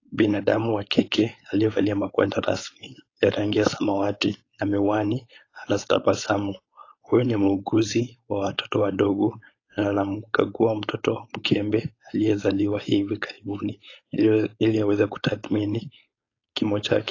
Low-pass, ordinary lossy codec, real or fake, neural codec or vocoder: 7.2 kHz; AAC, 32 kbps; fake; codec, 16 kHz, 4.8 kbps, FACodec